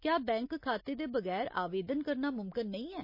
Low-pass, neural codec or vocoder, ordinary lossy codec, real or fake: 5.4 kHz; none; MP3, 24 kbps; real